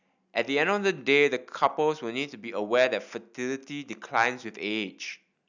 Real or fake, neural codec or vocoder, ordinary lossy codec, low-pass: real; none; none; 7.2 kHz